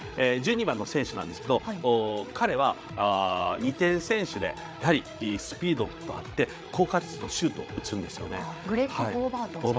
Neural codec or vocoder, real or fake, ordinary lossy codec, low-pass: codec, 16 kHz, 8 kbps, FreqCodec, larger model; fake; none; none